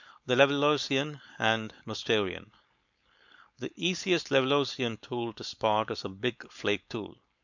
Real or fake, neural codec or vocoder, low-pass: fake; codec, 16 kHz, 4.8 kbps, FACodec; 7.2 kHz